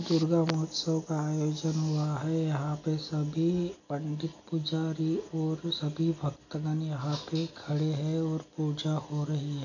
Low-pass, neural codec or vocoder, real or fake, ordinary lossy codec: 7.2 kHz; none; real; none